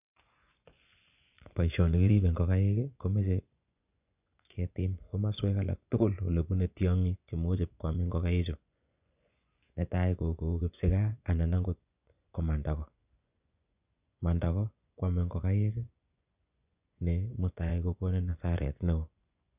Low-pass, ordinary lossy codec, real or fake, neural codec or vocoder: 3.6 kHz; none; fake; vocoder, 24 kHz, 100 mel bands, Vocos